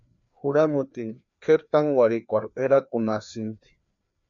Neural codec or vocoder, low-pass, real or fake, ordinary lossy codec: codec, 16 kHz, 2 kbps, FreqCodec, larger model; 7.2 kHz; fake; MP3, 96 kbps